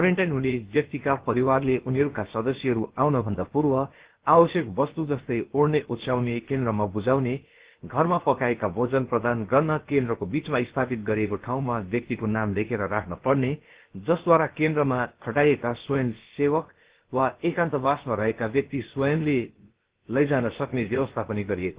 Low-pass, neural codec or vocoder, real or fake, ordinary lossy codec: 3.6 kHz; codec, 16 kHz, about 1 kbps, DyCAST, with the encoder's durations; fake; Opus, 16 kbps